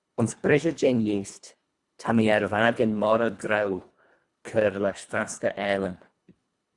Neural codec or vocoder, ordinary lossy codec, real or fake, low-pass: codec, 24 kHz, 1.5 kbps, HILCodec; Opus, 64 kbps; fake; 10.8 kHz